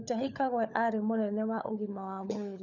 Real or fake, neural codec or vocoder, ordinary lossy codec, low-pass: fake; codec, 16 kHz, 16 kbps, FunCodec, trained on LibriTTS, 50 frames a second; none; 7.2 kHz